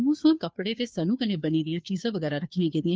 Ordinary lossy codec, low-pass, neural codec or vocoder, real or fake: none; none; codec, 16 kHz, 2 kbps, FunCodec, trained on Chinese and English, 25 frames a second; fake